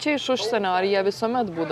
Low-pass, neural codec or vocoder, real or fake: 14.4 kHz; none; real